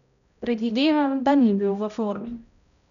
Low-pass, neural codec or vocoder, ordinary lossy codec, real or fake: 7.2 kHz; codec, 16 kHz, 0.5 kbps, X-Codec, HuBERT features, trained on balanced general audio; none; fake